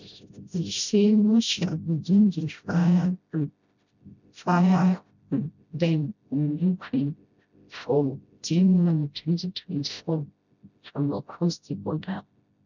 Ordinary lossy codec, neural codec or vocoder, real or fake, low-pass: none; codec, 16 kHz, 0.5 kbps, FreqCodec, smaller model; fake; 7.2 kHz